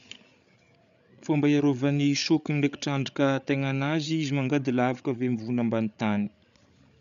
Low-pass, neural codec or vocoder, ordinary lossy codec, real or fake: 7.2 kHz; codec, 16 kHz, 8 kbps, FreqCodec, larger model; none; fake